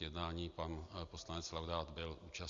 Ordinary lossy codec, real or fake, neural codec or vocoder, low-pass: Opus, 64 kbps; real; none; 7.2 kHz